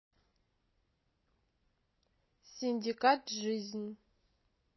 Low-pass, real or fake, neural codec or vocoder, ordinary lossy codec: 7.2 kHz; real; none; MP3, 24 kbps